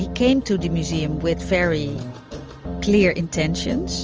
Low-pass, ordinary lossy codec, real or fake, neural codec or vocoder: 7.2 kHz; Opus, 24 kbps; real; none